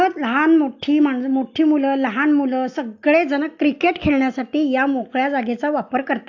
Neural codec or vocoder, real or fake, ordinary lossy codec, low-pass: none; real; AAC, 48 kbps; 7.2 kHz